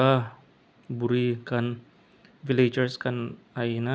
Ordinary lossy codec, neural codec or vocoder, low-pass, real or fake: none; none; none; real